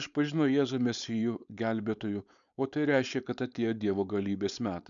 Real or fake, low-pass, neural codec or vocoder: fake; 7.2 kHz; codec, 16 kHz, 4.8 kbps, FACodec